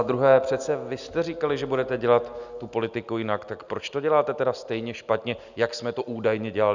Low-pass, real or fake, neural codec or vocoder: 7.2 kHz; real; none